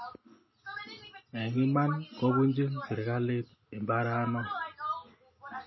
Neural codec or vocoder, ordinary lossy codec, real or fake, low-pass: none; MP3, 24 kbps; real; 7.2 kHz